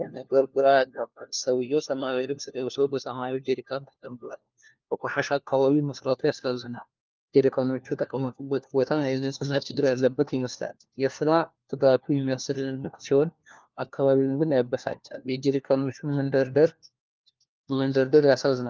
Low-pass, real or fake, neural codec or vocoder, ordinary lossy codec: 7.2 kHz; fake; codec, 16 kHz, 1 kbps, FunCodec, trained on LibriTTS, 50 frames a second; Opus, 24 kbps